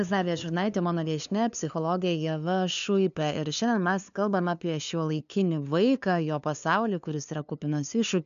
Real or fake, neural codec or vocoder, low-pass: fake; codec, 16 kHz, 2 kbps, FunCodec, trained on LibriTTS, 25 frames a second; 7.2 kHz